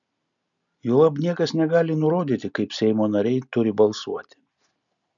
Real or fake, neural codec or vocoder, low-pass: real; none; 7.2 kHz